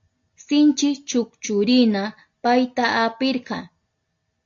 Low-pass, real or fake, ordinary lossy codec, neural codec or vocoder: 7.2 kHz; real; AAC, 48 kbps; none